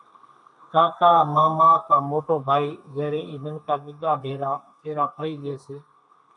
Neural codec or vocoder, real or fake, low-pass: codec, 32 kHz, 1.9 kbps, SNAC; fake; 10.8 kHz